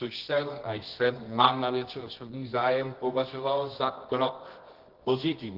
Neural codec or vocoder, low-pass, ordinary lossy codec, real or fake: codec, 24 kHz, 0.9 kbps, WavTokenizer, medium music audio release; 5.4 kHz; Opus, 16 kbps; fake